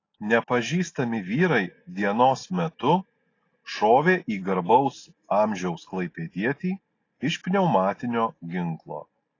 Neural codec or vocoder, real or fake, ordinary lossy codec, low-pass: none; real; AAC, 32 kbps; 7.2 kHz